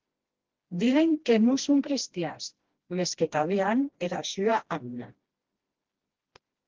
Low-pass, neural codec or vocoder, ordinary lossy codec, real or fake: 7.2 kHz; codec, 16 kHz, 1 kbps, FreqCodec, smaller model; Opus, 16 kbps; fake